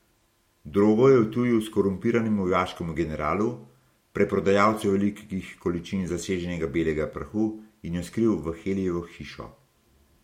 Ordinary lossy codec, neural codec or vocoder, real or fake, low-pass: MP3, 64 kbps; none; real; 19.8 kHz